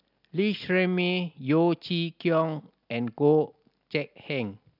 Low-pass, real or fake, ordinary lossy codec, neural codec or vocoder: 5.4 kHz; real; none; none